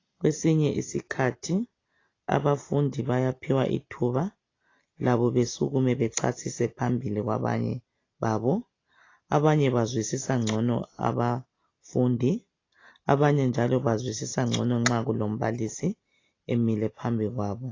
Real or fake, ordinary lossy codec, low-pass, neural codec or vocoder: real; AAC, 32 kbps; 7.2 kHz; none